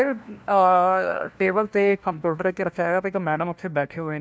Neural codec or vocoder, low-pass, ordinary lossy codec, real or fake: codec, 16 kHz, 1 kbps, FunCodec, trained on LibriTTS, 50 frames a second; none; none; fake